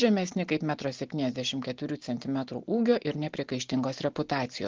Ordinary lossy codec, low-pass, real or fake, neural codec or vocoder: Opus, 16 kbps; 7.2 kHz; real; none